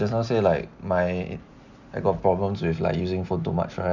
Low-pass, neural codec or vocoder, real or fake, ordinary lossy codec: 7.2 kHz; none; real; none